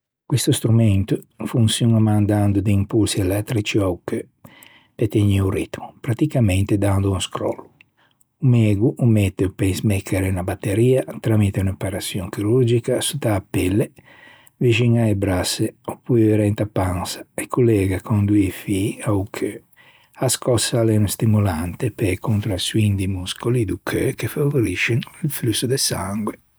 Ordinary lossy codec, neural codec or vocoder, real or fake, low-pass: none; none; real; none